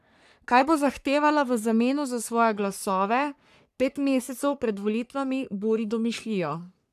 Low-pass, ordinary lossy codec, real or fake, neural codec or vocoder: 14.4 kHz; none; fake; codec, 44.1 kHz, 3.4 kbps, Pupu-Codec